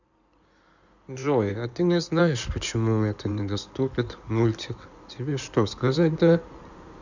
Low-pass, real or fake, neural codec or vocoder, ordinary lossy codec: 7.2 kHz; fake; codec, 16 kHz in and 24 kHz out, 2.2 kbps, FireRedTTS-2 codec; none